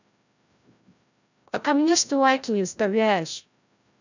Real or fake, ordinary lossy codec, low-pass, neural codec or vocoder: fake; none; 7.2 kHz; codec, 16 kHz, 0.5 kbps, FreqCodec, larger model